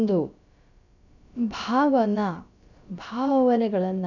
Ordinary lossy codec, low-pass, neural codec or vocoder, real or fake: none; 7.2 kHz; codec, 16 kHz, about 1 kbps, DyCAST, with the encoder's durations; fake